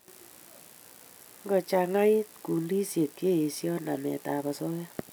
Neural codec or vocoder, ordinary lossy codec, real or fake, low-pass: none; none; real; none